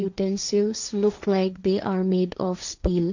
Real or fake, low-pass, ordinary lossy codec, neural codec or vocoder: fake; 7.2 kHz; none; codec, 16 kHz, 1.1 kbps, Voila-Tokenizer